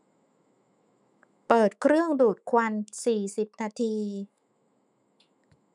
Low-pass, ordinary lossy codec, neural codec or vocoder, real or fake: 10.8 kHz; none; autoencoder, 48 kHz, 128 numbers a frame, DAC-VAE, trained on Japanese speech; fake